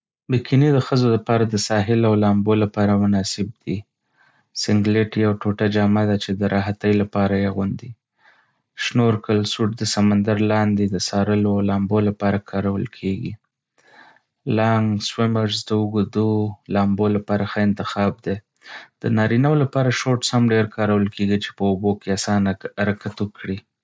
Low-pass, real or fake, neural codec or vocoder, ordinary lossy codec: none; real; none; none